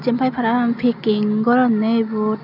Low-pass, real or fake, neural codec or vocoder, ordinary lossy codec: 5.4 kHz; real; none; none